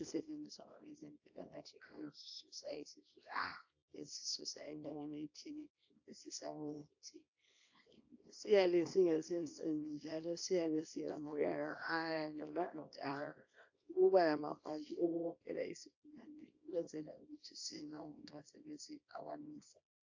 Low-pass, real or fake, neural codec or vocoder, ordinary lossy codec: 7.2 kHz; fake; codec, 24 kHz, 0.9 kbps, WavTokenizer, small release; AAC, 48 kbps